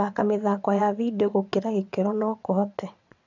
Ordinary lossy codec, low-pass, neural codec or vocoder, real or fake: none; 7.2 kHz; vocoder, 22.05 kHz, 80 mel bands, WaveNeXt; fake